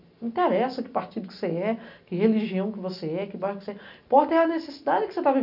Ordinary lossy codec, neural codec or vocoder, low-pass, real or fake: none; none; 5.4 kHz; real